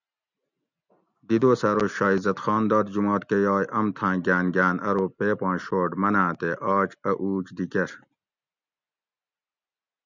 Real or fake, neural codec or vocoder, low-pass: real; none; 7.2 kHz